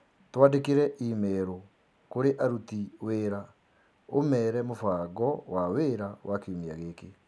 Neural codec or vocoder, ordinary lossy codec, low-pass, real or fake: none; none; none; real